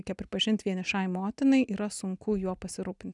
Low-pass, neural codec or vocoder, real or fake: 10.8 kHz; none; real